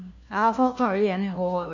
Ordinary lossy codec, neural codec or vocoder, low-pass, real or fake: none; codec, 16 kHz, 1 kbps, FunCodec, trained on LibriTTS, 50 frames a second; 7.2 kHz; fake